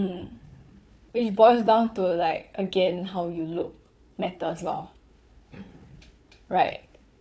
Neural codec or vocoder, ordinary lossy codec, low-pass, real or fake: codec, 16 kHz, 4 kbps, FunCodec, trained on Chinese and English, 50 frames a second; none; none; fake